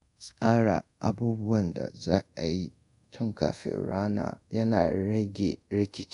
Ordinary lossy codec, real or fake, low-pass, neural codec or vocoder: none; fake; 10.8 kHz; codec, 24 kHz, 0.5 kbps, DualCodec